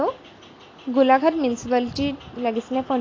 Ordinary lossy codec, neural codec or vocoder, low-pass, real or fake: AAC, 32 kbps; none; 7.2 kHz; real